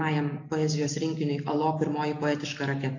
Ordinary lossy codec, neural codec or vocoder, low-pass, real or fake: AAC, 48 kbps; none; 7.2 kHz; real